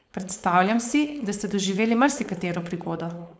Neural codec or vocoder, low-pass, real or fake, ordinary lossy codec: codec, 16 kHz, 4.8 kbps, FACodec; none; fake; none